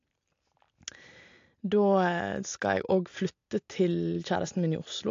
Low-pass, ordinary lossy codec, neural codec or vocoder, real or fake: 7.2 kHz; AAC, 48 kbps; none; real